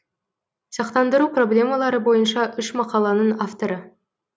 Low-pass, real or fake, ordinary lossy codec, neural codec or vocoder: none; real; none; none